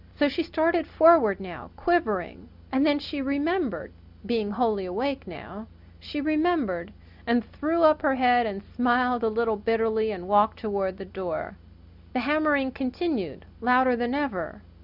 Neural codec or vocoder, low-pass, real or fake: none; 5.4 kHz; real